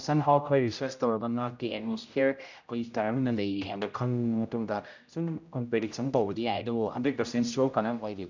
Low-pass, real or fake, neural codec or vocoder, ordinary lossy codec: 7.2 kHz; fake; codec, 16 kHz, 0.5 kbps, X-Codec, HuBERT features, trained on general audio; none